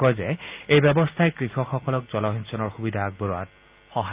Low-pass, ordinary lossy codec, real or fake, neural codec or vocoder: 3.6 kHz; Opus, 24 kbps; real; none